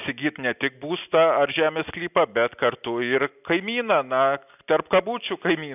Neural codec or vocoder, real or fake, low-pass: none; real; 3.6 kHz